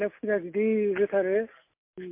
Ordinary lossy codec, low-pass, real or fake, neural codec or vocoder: MP3, 32 kbps; 3.6 kHz; real; none